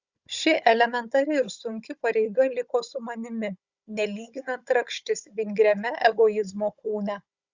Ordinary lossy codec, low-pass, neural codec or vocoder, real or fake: Opus, 64 kbps; 7.2 kHz; codec, 16 kHz, 16 kbps, FunCodec, trained on Chinese and English, 50 frames a second; fake